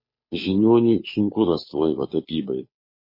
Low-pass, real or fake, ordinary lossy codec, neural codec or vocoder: 5.4 kHz; fake; MP3, 24 kbps; codec, 16 kHz, 2 kbps, FunCodec, trained on Chinese and English, 25 frames a second